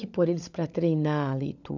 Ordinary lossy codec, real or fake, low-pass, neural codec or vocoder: none; fake; 7.2 kHz; codec, 16 kHz, 16 kbps, FunCodec, trained on LibriTTS, 50 frames a second